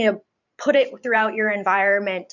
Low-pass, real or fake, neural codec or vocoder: 7.2 kHz; fake; vocoder, 44.1 kHz, 128 mel bands every 512 samples, BigVGAN v2